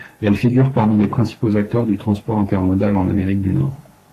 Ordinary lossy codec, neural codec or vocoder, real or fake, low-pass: AAC, 48 kbps; codec, 44.1 kHz, 2.6 kbps, SNAC; fake; 14.4 kHz